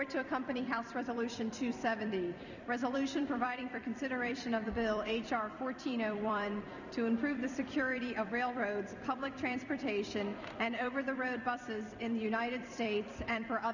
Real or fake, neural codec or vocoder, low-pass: fake; vocoder, 44.1 kHz, 128 mel bands every 256 samples, BigVGAN v2; 7.2 kHz